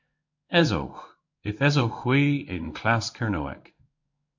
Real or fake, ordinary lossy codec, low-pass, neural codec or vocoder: fake; MP3, 64 kbps; 7.2 kHz; codec, 16 kHz in and 24 kHz out, 1 kbps, XY-Tokenizer